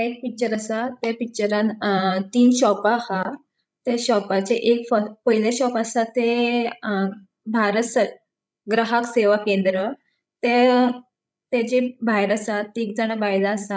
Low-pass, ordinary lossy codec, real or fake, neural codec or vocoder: none; none; fake; codec, 16 kHz, 16 kbps, FreqCodec, larger model